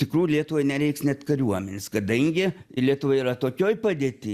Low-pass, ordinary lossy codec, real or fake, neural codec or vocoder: 14.4 kHz; Opus, 64 kbps; real; none